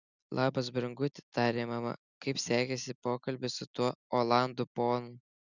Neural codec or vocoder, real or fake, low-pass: none; real; 7.2 kHz